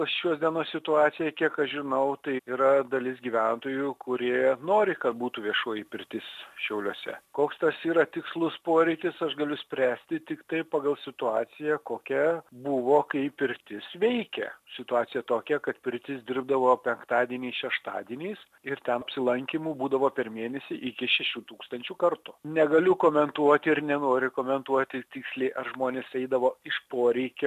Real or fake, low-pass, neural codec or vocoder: real; 14.4 kHz; none